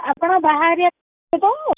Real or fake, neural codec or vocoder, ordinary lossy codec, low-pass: real; none; none; 3.6 kHz